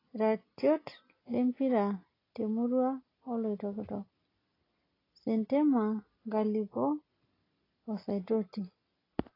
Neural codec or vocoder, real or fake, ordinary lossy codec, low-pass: none; real; AAC, 24 kbps; 5.4 kHz